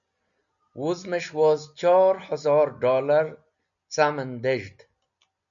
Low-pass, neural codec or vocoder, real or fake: 7.2 kHz; none; real